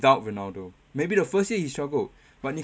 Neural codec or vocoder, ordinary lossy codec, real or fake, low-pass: none; none; real; none